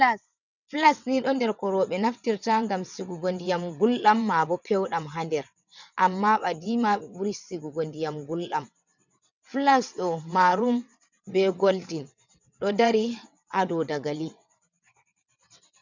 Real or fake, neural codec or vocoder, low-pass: fake; vocoder, 22.05 kHz, 80 mel bands, WaveNeXt; 7.2 kHz